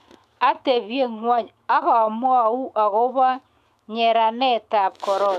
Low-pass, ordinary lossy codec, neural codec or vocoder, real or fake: 14.4 kHz; AAC, 96 kbps; autoencoder, 48 kHz, 128 numbers a frame, DAC-VAE, trained on Japanese speech; fake